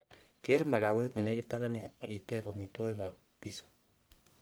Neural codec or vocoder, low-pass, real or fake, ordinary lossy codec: codec, 44.1 kHz, 1.7 kbps, Pupu-Codec; none; fake; none